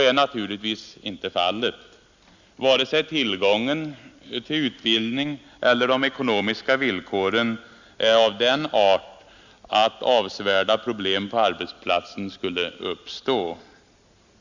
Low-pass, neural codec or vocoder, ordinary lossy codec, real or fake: 7.2 kHz; none; Opus, 64 kbps; real